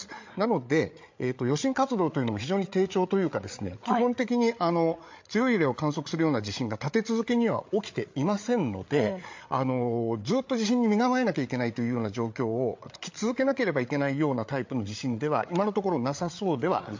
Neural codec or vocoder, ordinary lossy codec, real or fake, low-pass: codec, 16 kHz, 8 kbps, FreqCodec, larger model; MP3, 48 kbps; fake; 7.2 kHz